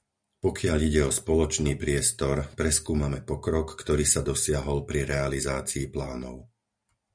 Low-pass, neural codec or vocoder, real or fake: 9.9 kHz; none; real